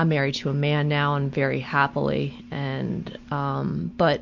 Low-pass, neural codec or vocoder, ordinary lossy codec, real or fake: 7.2 kHz; none; MP3, 48 kbps; real